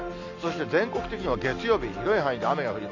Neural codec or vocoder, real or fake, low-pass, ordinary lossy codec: none; real; 7.2 kHz; none